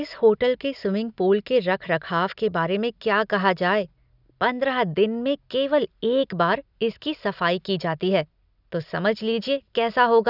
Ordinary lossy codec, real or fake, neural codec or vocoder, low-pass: none; real; none; 5.4 kHz